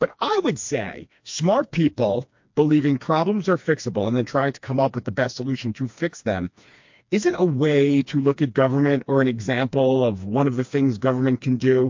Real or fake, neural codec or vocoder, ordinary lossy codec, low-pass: fake; codec, 16 kHz, 2 kbps, FreqCodec, smaller model; MP3, 48 kbps; 7.2 kHz